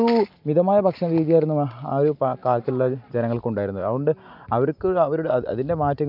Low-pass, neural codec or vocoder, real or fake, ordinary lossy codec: 5.4 kHz; none; real; none